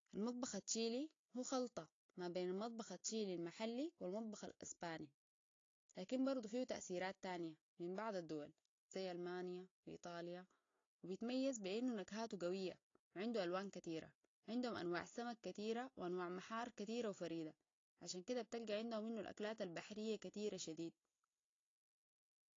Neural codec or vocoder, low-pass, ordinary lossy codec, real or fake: none; 7.2 kHz; AAC, 32 kbps; real